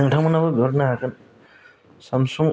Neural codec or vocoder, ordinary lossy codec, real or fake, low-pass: none; none; real; none